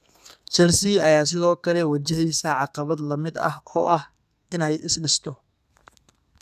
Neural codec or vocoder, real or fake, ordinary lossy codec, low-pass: codec, 32 kHz, 1.9 kbps, SNAC; fake; none; 14.4 kHz